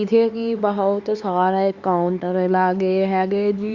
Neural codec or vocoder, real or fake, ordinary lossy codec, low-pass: codec, 16 kHz, 4 kbps, X-Codec, HuBERT features, trained on LibriSpeech; fake; Opus, 64 kbps; 7.2 kHz